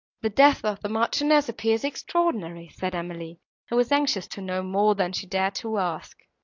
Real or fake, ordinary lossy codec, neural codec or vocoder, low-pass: real; AAC, 48 kbps; none; 7.2 kHz